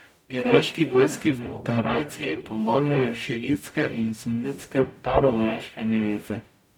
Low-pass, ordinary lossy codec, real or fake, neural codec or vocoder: 19.8 kHz; none; fake; codec, 44.1 kHz, 0.9 kbps, DAC